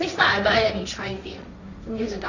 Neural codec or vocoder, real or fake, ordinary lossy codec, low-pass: codec, 16 kHz, 1.1 kbps, Voila-Tokenizer; fake; none; 7.2 kHz